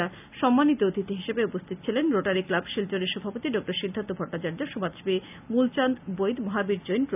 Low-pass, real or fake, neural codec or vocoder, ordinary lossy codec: 3.6 kHz; real; none; none